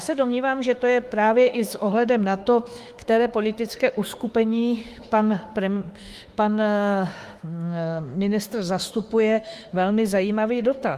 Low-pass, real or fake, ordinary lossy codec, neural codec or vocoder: 14.4 kHz; fake; Opus, 32 kbps; autoencoder, 48 kHz, 32 numbers a frame, DAC-VAE, trained on Japanese speech